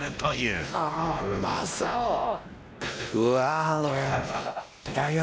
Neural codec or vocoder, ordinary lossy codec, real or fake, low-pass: codec, 16 kHz, 1 kbps, X-Codec, WavLM features, trained on Multilingual LibriSpeech; none; fake; none